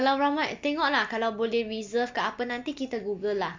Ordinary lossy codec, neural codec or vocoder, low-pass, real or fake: MP3, 64 kbps; none; 7.2 kHz; real